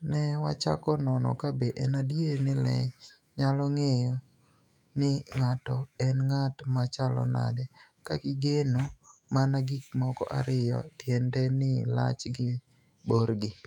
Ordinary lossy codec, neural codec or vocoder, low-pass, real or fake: none; autoencoder, 48 kHz, 128 numbers a frame, DAC-VAE, trained on Japanese speech; 19.8 kHz; fake